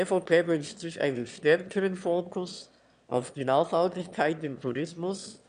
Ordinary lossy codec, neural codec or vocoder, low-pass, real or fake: none; autoencoder, 22.05 kHz, a latent of 192 numbers a frame, VITS, trained on one speaker; 9.9 kHz; fake